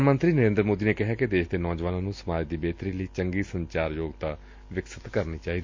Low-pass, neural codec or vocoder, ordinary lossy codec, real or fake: 7.2 kHz; none; MP3, 48 kbps; real